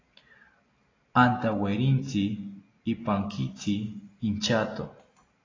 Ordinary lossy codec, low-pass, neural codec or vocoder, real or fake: AAC, 32 kbps; 7.2 kHz; none; real